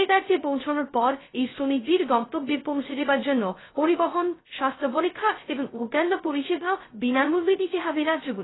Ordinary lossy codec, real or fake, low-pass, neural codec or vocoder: AAC, 16 kbps; fake; 7.2 kHz; codec, 16 kHz, 0.2 kbps, FocalCodec